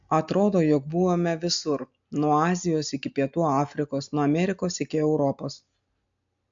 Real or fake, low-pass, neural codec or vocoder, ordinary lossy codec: real; 7.2 kHz; none; AAC, 64 kbps